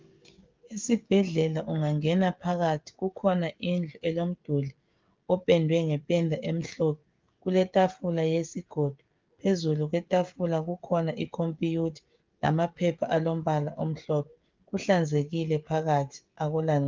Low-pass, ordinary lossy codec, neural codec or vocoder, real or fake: 7.2 kHz; Opus, 32 kbps; codec, 16 kHz, 16 kbps, FreqCodec, smaller model; fake